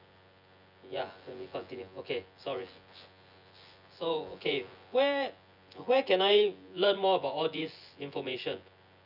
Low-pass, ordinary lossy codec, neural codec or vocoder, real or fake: 5.4 kHz; none; vocoder, 24 kHz, 100 mel bands, Vocos; fake